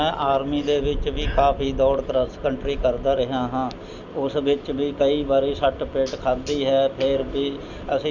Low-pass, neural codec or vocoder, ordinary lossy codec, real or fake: 7.2 kHz; none; none; real